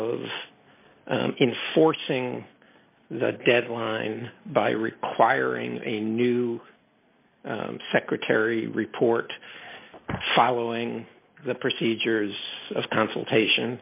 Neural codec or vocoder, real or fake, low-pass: none; real; 3.6 kHz